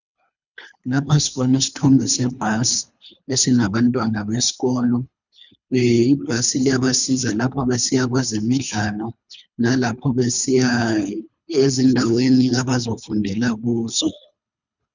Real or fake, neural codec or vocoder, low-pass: fake; codec, 24 kHz, 3 kbps, HILCodec; 7.2 kHz